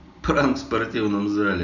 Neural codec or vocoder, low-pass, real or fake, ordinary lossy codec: none; 7.2 kHz; real; none